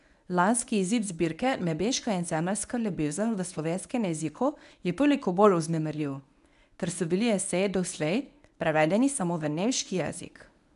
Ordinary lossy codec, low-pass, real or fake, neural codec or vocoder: none; 10.8 kHz; fake; codec, 24 kHz, 0.9 kbps, WavTokenizer, medium speech release version 1